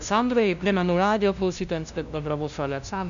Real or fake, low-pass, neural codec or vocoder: fake; 7.2 kHz; codec, 16 kHz, 0.5 kbps, FunCodec, trained on LibriTTS, 25 frames a second